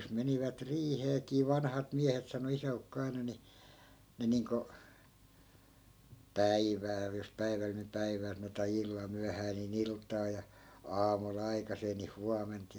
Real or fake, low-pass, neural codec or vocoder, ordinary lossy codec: real; none; none; none